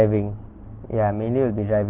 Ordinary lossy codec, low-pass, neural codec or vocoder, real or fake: Opus, 16 kbps; 3.6 kHz; none; real